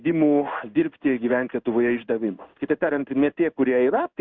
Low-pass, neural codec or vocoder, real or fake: 7.2 kHz; codec, 16 kHz in and 24 kHz out, 1 kbps, XY-Tokenizer; fake